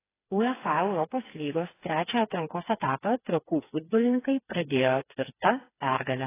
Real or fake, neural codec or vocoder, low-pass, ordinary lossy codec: fake; codec, 16 kHz, 4 kbps, FreqCodec, smaller model; 3.6 kHz; AAC, 16 kbps